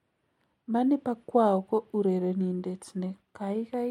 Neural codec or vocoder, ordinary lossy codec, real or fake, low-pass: none; MP3, 64 kbps; real; 19.8 kHz